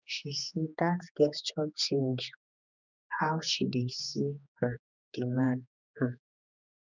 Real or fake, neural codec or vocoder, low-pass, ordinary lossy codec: fake; codec, 16 kHz, 2 kbps, X-Codec, HuBERT features, trained on general audio; 7.2 kHz; none